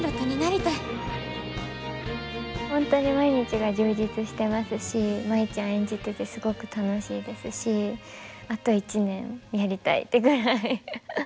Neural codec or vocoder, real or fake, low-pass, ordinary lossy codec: none; real; none; none